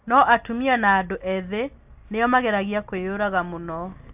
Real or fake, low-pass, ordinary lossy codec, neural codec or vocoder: real; 3.6 kHz; AAC, 32 kbps; none